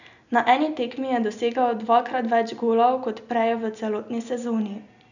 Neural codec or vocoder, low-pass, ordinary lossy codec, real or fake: none; 7.2 kHz; none; real